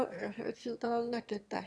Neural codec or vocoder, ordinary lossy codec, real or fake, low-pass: autoencoder, 22.05 kHz, a latent of 192 numbers a frame, VITS, trained on one speaker; none; fake; none